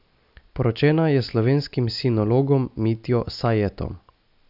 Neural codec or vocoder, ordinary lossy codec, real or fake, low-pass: none; none; real; 5.4 kHz